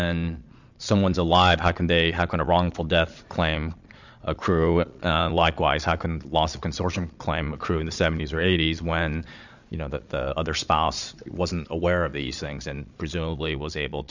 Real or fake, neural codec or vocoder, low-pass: fake; vocoder, 22.05 kHz, 80 mel bands, Vocos; 7.2 kHz